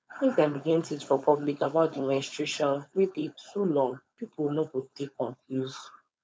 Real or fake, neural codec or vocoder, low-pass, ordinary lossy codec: fake; codec, 16 kHz, 4.8 kbps, FACodec; none; none